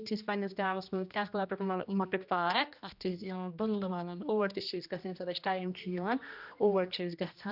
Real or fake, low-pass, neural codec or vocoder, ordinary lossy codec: fake; 5.4 kHz; codec, 16 kHz, 1 kbps, X-Codec, HuBERT features, trained on general audio; none